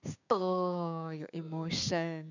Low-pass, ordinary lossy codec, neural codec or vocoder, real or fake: 7.2 kHz; none; codec, 16 kHz, 6 kbps, DAC; fake